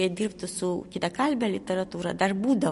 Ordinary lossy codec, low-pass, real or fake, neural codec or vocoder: MP3, 48 kbps; 14.4 kHz; real; none